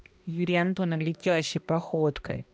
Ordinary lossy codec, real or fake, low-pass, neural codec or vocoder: none; fake; none; codec, 16 kHz, 1 kbps, X-Codec, HuBERT features, trained on balanced general audio